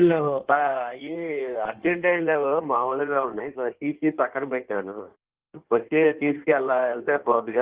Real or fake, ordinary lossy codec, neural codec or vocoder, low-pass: fake; Opus, 16 kbps; codec, 16 kHz in and 24 kHz out, 1.1 kbps, FireRedTTS-2 codec; 3.6 kHz